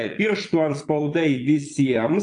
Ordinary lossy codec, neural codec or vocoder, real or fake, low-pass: AAC, 64 kbps; vocoder, 22.05 kHz, 80 mel bands, Vocos; fake; 9.9 kHz